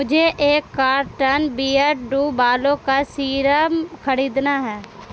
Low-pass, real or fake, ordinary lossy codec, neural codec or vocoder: none; real; none; none